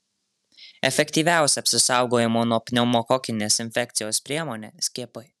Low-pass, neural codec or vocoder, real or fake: 14.4 kHz; vocoder, 44.1 kHz, 128 mel bands every 512 samples, BigVGAN v2; fake